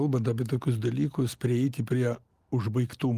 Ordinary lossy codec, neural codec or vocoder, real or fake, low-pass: Opus, 24 kbps; none; real; 14.4 kHz